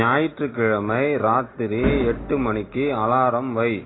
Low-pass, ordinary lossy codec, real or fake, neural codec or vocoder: 7.2 kHz; AAC, 16 kbps; real; none